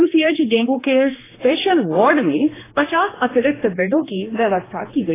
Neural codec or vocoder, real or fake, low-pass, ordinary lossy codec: codec, 16 kHz, 2 kbps, X-Codec, HuBERT features, trained on general audio; fake; 3.6 kHz; AAC, 16 kbps